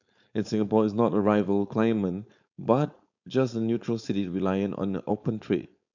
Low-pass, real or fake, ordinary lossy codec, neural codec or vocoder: 7.2 kHz; fake; none; codec, 16 kHz, 4.8 kbps, FACodec